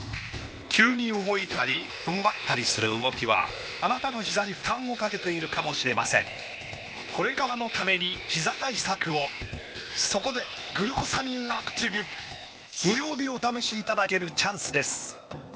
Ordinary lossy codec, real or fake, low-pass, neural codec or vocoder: none; fake; none; codec, 16 kHz, 0.8 kbps, ZipCodec